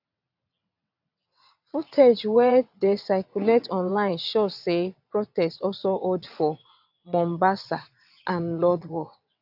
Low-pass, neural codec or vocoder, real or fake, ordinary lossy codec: 5.4 kHz; vocoder, 22.05 kHz, 80 mel bands, WaveNeXt; fake; AAC, 48 kbps